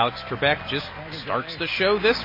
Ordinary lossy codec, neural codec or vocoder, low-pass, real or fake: MP3, 24 kbps; none; 5.4 kHz; real